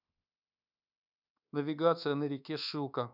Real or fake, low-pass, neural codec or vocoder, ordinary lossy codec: fake; 5.4 kHz; codec, 24 kHz, 1.2 kbps, DualCodec; none